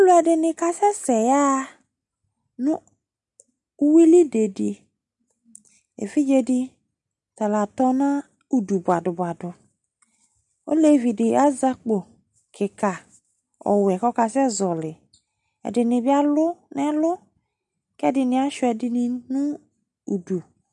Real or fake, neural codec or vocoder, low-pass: real; none; 10.8 kHz